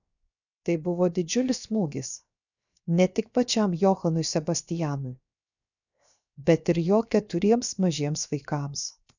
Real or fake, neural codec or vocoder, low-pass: fake; codec, 16 kHz, 0.7 kbps, FocalCodec; 7.2 kHz